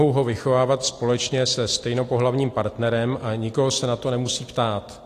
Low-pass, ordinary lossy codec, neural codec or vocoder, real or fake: 14.4 kHz; AAC, 48 kbps; none; real